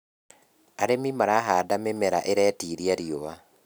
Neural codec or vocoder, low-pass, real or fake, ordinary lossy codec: none; none; real; none